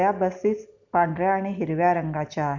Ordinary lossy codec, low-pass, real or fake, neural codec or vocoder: none; 7.2 kHz; real; none